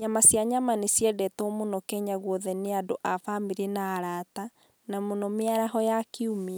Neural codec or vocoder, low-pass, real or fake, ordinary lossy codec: none; none; real; none